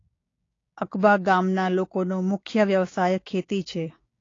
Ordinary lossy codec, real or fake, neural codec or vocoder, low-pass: AAC, 32 kbps; fake; codec, 16 kHz, 6 kbps, DAC; 7.2 kHz